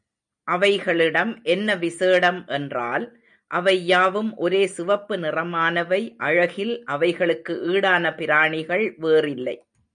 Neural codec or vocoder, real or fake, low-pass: none; real; 9.9 kHz